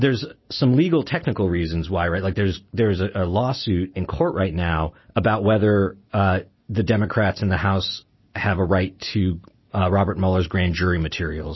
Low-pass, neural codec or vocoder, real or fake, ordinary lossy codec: 7.2 kHz; none; real; MP3, 24 kbps